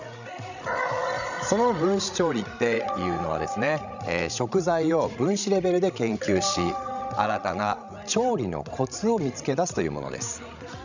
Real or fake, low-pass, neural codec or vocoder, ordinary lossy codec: fake; 7.2 kHz; codec, 16 kHz, 16 kbps, FreqCodec, larger model; none